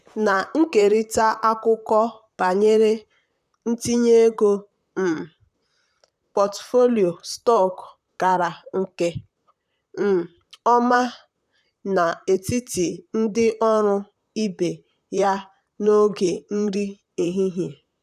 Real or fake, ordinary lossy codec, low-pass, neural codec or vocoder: fake; none; 14.4 kHz; vocoder, 44.1 kHz, 128 mel bands, Pupu-Vocoder